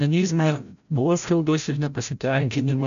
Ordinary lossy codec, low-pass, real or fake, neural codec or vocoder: MP3, 96 kbps; 7.2 kHz; fake; codec, 16 kHz, 0.5 kbps, FreqCodec, larger model